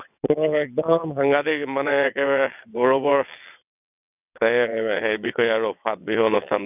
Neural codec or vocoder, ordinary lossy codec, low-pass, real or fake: vocoder, 22.05 kHz, 80 mel bands, WaveNeXt; none; 3.6 kHz; fake